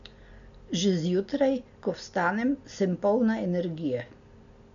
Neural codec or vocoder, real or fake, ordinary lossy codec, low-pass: none; real; MP3, 96 kbps; 7.2 kHz